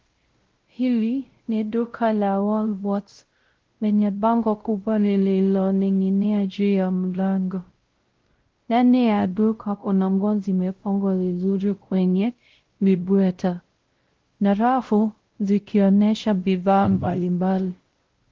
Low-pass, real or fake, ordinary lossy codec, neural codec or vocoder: 7.2 kHz; fake; Opus, 16 kbps; codec, 16 kHz, 0.5 kbps, X-Codec, WavLM features, trained on Multilingual LibriSpeech